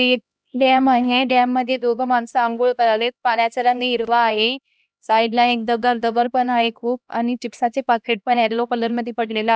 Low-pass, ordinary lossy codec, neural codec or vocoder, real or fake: none; none; codec, 16 kHz, 1 kbps, X-Codec, HuBERT features, trained on LibriSpeech; fake